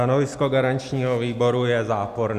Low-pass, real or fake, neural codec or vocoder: 14.4 kHz; real; none